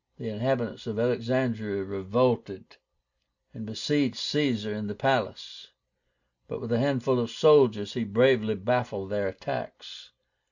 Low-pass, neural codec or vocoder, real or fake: 7.2 kHz; none; real